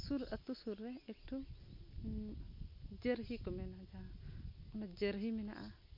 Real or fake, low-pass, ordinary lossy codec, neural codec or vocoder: real; 5.4 kHz; MP3, 32 kbps; none